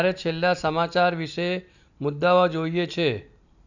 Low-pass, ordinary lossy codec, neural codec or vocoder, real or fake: 7.2 kHz; none; none; real